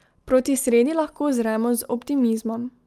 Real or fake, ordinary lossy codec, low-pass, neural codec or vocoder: real; Opus, 32 kbps; 14.4 kHz; none